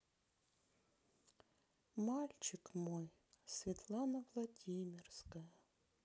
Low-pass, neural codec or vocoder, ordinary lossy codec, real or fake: none; none; none; real